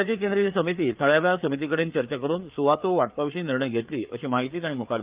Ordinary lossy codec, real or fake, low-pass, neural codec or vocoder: Opus, 32 kbps; fake; 3.6 kHz; codec, 16 kHz, 4 kbps, FreqCodec, larger model